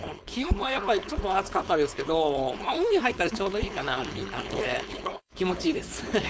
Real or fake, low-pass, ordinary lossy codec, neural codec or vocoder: fake; none; none; codec, 16 kHz, 4.8 kbps, FACodec